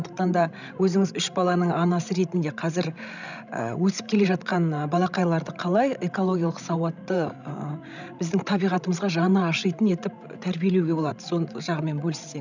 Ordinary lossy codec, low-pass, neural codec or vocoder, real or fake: none; 7.2 kHz; codec, 16 kHz, 16 kbps, FreqCodec, larger model; fake